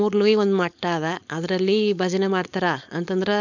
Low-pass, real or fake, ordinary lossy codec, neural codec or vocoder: 7.2 kHz; fake; none; codec, 16 kHz, 4.8 kbps, FACodec